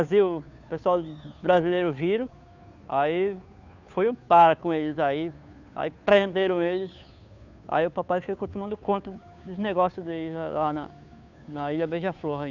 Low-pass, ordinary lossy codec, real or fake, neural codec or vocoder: 7.2 kHz; none; fake; codec, 16 kHz, 2 kbps, FunCodec, trained on Chinese and English, 25 frames a second